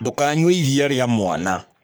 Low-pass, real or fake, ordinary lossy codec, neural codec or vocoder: none; fake; none; codec, 44.1 kHz, 3.4 kbps, Pupu-Codec